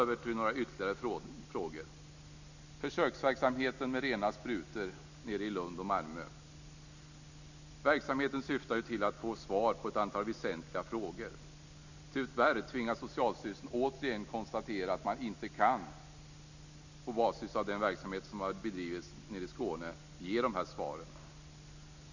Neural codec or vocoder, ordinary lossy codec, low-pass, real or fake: none; none; 7.2 kHz; real